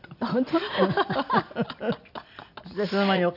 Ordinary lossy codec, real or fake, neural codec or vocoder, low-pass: none; real; none; 5.4 kHz